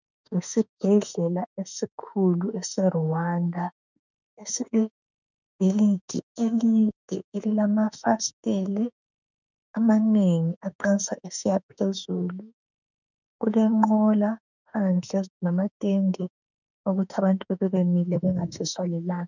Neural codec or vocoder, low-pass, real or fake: autoencoder, 48 kHz, 32 numbers a frame, DAC-VAE, trained on Japanese speech; 7.2 kHz; fake